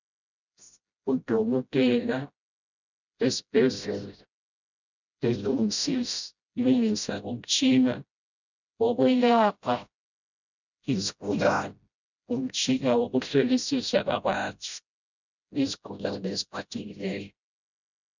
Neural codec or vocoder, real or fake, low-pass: codec, 16 kHz, 0.5 kbps, FreqCodec, smaller model; fake; 7.2 kHz